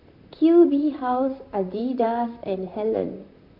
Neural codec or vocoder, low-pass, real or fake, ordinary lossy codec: vocoder, 44.1 kHz, 128 mel bands, Pupu-Vocoder; 5.4 kHz; fake; none